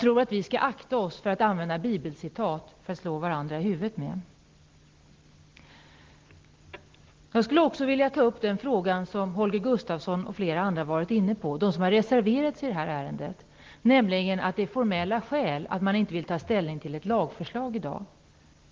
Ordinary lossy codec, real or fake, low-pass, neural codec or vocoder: Opus, 16 kbps; real; 7.2 kHz; none